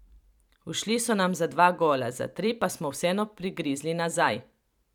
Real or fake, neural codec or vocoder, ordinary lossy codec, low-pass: fake; vocoder, 44.1 kHz, 128 mel bands every 512 samples, BigVGAN v2; none; 19.8 kHz